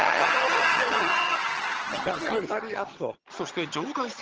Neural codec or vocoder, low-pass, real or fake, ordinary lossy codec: vocoder, 22.05 kHz, 80 mel bands, HiFi-GAN; 7.2 kHz; fake; Opus, 16 kbps